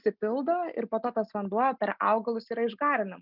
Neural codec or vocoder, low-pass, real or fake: none; 5.4 kHz; real